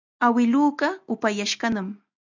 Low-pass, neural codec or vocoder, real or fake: 7.2 kHz; none; real